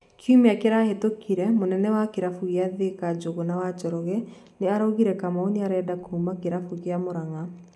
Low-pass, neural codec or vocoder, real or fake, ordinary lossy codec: none; none; real; none